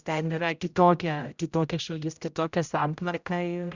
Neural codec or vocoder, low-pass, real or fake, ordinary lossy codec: codec, 16 kHz, 0.5 kbps, X-Codec, HuBERT features, trained on general audio; 7.2 kHz; fake; Opus, 64 kbps